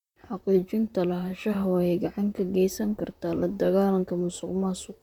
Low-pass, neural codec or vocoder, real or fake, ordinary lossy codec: 19.8 kHz; vocoder, 44.1 kHz, 128 mel bands, Pupu-Vocoder; fake; MP3, 96 kbps